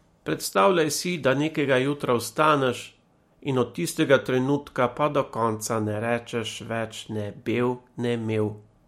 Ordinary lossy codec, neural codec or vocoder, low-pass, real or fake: MP3, 64 kbps; none; 19.8 kHz; real